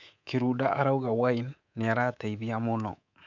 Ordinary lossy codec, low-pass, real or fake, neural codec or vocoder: none; 7.2 kHz; fake; codec, 24 kHz, 3.1 kbps, DualCodec